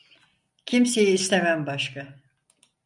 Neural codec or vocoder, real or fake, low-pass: none; real; 10.8 kHz